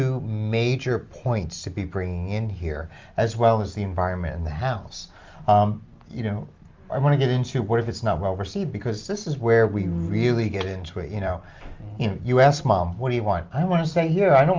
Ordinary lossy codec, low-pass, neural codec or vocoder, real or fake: Opus, 32 kbps; 7.2 kHz; none; real